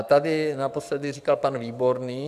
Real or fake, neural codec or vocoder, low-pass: fake; codec, 44.1 kHz, 7.8 kbps, DAC; 14.4 kHz